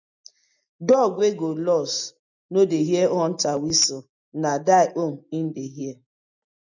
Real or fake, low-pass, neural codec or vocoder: real; 7.2 kHz; none